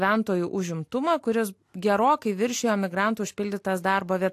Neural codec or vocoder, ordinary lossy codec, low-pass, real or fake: none; AAC, 64 kbps; 14.4 kHz; real